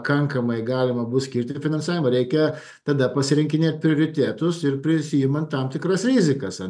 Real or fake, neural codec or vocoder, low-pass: real; none; 9.9 kHz